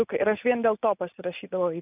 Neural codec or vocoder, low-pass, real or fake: none; 3.6 kHz; real